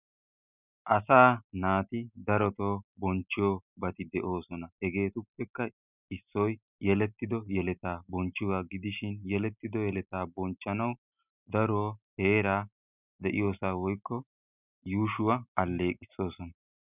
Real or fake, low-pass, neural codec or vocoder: real; 3.6 kHz; none